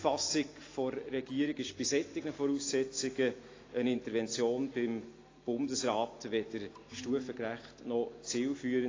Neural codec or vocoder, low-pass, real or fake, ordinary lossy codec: none; 7.2 kHz; real; AAC, 32 kbps